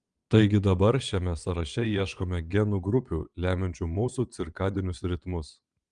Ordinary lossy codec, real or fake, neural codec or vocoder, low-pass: Opus, 32 kbps; fake; vocoder, 22.05 kHz, 80 mel bands, WaveNeXt; 9.9 kHz